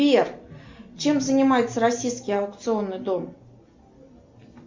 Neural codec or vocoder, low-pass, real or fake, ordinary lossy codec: none; 7.2 kHz; real; MP3, 64 kbps